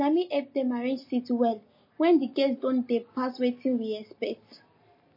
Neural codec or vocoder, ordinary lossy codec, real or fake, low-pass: none; MP3, 24 kbps; real; 5.4 kHz